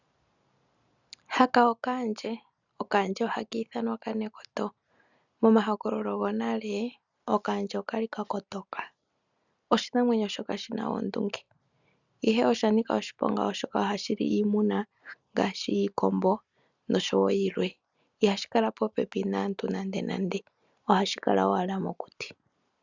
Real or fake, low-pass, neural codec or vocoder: real; 7.2 kHz; none